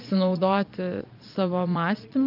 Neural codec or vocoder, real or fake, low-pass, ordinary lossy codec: vocoder, 22.05 kHz, 80 mel bands, Vocos; fake; 5.4 kHz; AAC, 48 kbps